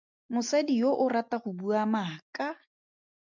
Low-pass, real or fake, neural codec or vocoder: 7.2 kHz; real; none